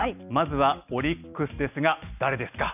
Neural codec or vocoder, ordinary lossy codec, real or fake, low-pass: none; none; real; 3.6 kHz